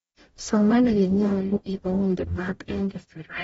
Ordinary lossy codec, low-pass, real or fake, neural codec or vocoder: AAC, 24 kbps; 19.8 kHz; fake; codec, 44.1 kHz, 0.9 kbps, DAC